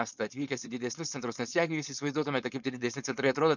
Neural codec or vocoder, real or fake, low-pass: codec, 16 kHz, 4.8 kbps, FACodec; fake; 7.2 kHz